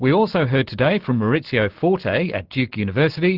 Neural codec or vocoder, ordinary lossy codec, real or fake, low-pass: none; Opus, 16 kbps; real; 5.4 kHz